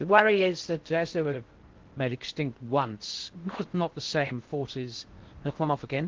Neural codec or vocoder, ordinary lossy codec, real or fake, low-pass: codec, 16 kHz in and 24 kHz out, 0.6 kbps, FocalCodec, streaming, 4096 codes; Opus, 16 kbps; fake; 7.2 kHz